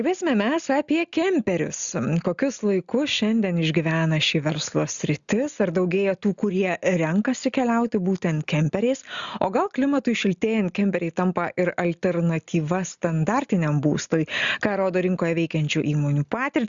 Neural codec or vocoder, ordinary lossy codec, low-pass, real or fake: none; Opus, 64 kbps; 7.2 kHz; real